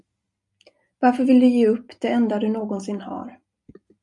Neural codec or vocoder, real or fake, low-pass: none; real; 10.8 kHz